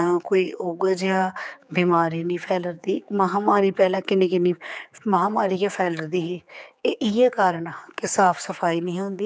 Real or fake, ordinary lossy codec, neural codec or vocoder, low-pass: fake; none; codec, 16 kHz, 4 kbps, X-Codec, HuBERT features, trained on general audio; none